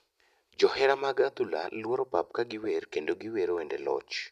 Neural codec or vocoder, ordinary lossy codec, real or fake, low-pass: none; none; real; 14.4 kHz